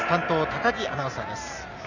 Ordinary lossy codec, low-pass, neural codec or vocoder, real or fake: none; 7.2 kHz; none; real